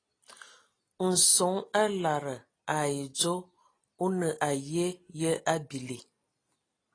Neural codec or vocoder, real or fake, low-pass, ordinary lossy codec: none; real; 9.9 kHz; AAC, 32 kbps